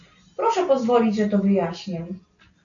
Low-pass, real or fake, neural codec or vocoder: 7.2 kHz; real; none